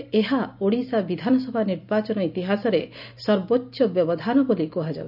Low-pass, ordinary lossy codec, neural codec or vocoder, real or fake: 5.4 kHz; none; none; real